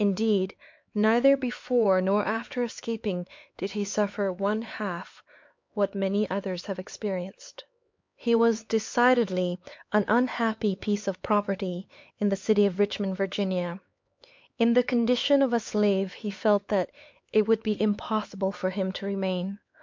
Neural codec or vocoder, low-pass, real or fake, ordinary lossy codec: codec, 16 kHz, 4 kbps, X-Codec, HuBERT features, trained on LibriSpeech; 7.2 kHz; fake; MP3, 48 kbps